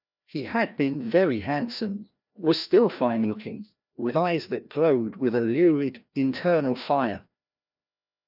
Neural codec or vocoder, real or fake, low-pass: codec, 16 kHz, 1 kbps, FreqCodec, larger model; fake; 5.4 kHz